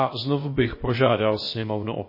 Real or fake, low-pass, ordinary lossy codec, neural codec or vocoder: fake; 5.4 kHz; MP3, 24 kbps; codec, 16 kHz, about 1 kbps, DyCAST, with the encoder's durations